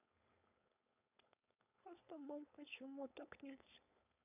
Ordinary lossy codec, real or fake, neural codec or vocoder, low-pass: none; fake; codec, 16 kHz, 4.8 kbps, FACodec; 3.6 kHz